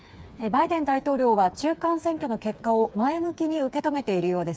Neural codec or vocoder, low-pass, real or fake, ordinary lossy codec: codec, 16 kHz, 4 kbps, FreqCodec, smaller model; none; fake; none